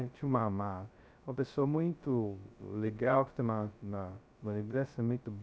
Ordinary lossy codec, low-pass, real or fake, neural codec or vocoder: none; none; fake; codec, 16 kHz, 0.2 kbps, FocalCodec